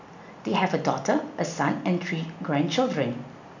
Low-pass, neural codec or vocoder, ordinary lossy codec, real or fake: 7.2 kHz; none; none; real